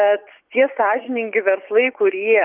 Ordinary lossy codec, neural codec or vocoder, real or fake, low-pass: Opus, 24 kbps; vocoder, 24 kHz, 100 mel bands, Vocos; fake; 3.6 kHz